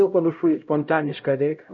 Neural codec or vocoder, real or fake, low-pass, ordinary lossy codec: codec, 16 kHz, 0.5 kbps, X-Codec, HuBERT features, trained on LibriSpeech; fake; 7.2 kHz; MP3, 48 kbps